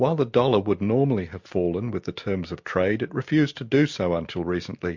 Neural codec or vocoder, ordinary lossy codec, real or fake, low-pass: none; MP3, 48 kbps; real; 7.2 kHz